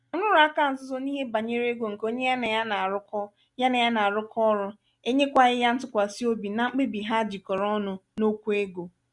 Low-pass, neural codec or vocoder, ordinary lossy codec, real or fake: 10.8 kHz; none; none; real